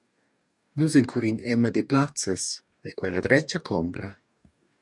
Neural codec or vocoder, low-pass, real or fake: codec, 44.1 kHz, 2.6 kbps, DAC; 10.8 kHz; fake